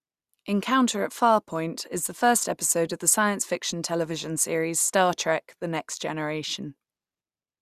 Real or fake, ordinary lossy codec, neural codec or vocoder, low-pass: real; Opus, 64 kbps; none; 14.4 kHz